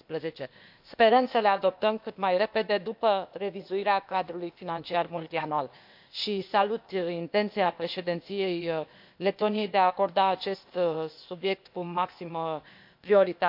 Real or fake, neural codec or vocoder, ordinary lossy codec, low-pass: fake; codec, 16 kHz, 0.8 kbps, ZipCodec; MP3, 48 kbps; 5.4 kHz